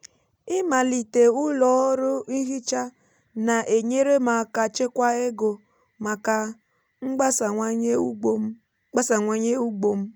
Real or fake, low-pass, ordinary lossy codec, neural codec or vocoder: real; none; none; none